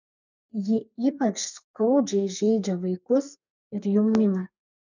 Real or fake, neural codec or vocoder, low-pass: fake; codec, 32 kHz, 1.9 kbps, SNAC; 7.2 kHz